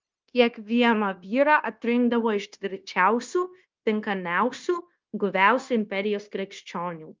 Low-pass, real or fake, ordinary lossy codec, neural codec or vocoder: 7.2 kHz; fake; Opus, 24 kbps; codec, 16 kHz, 0.9 kbps, LongCat-Audio-Codec